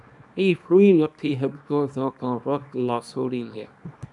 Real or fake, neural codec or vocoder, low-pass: fake; codec, 24 kHz, 0.9 kbps, WavTokenizer, small release; 10.8 kHz